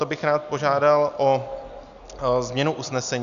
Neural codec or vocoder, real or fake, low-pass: none; real; 7.2 kHz